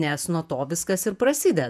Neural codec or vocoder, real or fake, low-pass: vocoder, 48 kHz, 128 mel bands, Vocos; fake; 14.4 kHz